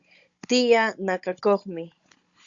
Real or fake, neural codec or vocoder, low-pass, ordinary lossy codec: fake; codec, 16 kHz, 16 kbps, FunCodec, trained on Chinese and English, 50 frames a second; 7.2 kHz; Opus, 64 kbps